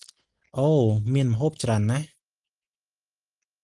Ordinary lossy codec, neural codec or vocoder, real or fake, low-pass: Opus, 24 kbps; none; real; 10.8 kHz